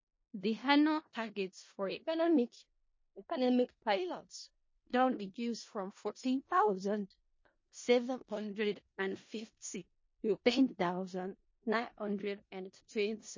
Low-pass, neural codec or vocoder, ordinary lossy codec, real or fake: 7.2 kHz; codec, 16 kHz in and 24 kHz out, 0.4 kbps, LongCat-Audio-Codec, four codebook decoder; MP3, 32 kbps; fake